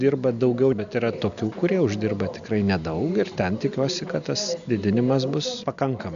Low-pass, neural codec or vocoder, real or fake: 7.2 kHz; none; real